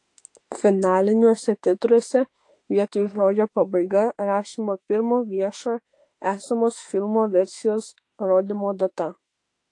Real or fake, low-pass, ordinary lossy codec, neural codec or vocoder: fake; 10.8 kHz; AAC, 48 kbps; autoencoder, 48 kHz, 32 numbers a frame, DAC-VAE, trained on Japanese speech